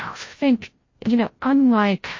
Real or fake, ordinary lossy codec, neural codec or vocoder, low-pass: fake; MP3, 32 kbps; codec, 16 kHz, 0.5 kbps, FreqCodec, larger model; 7.2 kHz